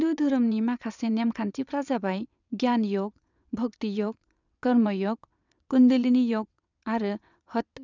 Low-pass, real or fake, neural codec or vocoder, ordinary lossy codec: 7.2 kHz; fake; vocoder, 22.05 kHz, 80 mel bands, WaveNeXt; none